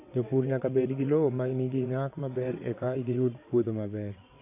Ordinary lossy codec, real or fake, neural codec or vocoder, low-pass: AAC, 24 kbps; fake; vocoder, 22.05 kHz, 80 mel bands, WaveNeXt; 3.6 kHz